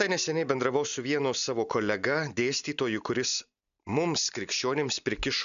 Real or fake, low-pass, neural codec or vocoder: real; 7.2 kHz; none